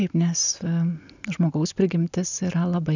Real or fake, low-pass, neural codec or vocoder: real; 7.2 kHz; none